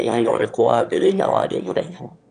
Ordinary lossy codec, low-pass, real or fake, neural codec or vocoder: AAC, 96 kbps; 9.9 kHz; fake; autoencoder, 22.05 kHz, a latent of 192 numbers a frame, VITS, trained on one speaker